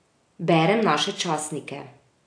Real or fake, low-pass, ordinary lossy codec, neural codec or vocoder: real; 9.9 kHz; AAC, 48 kbps; none